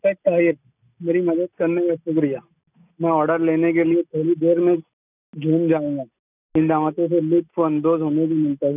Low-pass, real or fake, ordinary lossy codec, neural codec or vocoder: 3.6 kHz; real; none; none